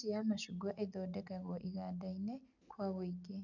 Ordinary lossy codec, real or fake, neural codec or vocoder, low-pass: none; real; none; 7.2 kHz